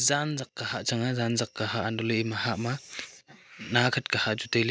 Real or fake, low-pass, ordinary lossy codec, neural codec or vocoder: real; none; none; none